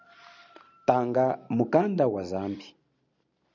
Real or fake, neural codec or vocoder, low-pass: real; none; 7.2 kHz